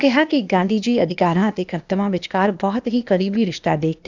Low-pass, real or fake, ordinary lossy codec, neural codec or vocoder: 7.2 kHz; fake; none; codec, 16 kHz, 0.8 kbps, ZipCodec